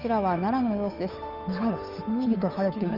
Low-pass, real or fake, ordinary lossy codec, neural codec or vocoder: 5.4 kHz; fake; Opus, 32 kbps; codec, 16 kHz, 8 kbps, FunCodec, trained on Chinese and English, 25 frames a second